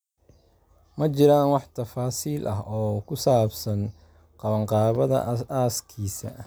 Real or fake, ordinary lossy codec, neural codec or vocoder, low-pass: real; none; none; none